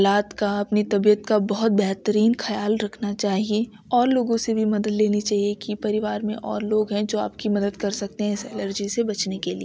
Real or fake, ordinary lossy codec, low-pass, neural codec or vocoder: real; none; none; none